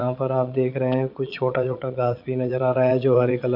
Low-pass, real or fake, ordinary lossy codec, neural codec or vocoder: 5.4 kHz; fake; MP3, 48 kbps; vocoder, 22.05 kHz, 80 mel bands, Vocos